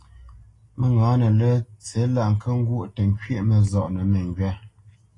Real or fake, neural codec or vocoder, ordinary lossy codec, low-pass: real; none; AAC, 32 kbps; 10.8 kHz